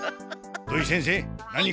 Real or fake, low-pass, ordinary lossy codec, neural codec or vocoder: real; none; none; none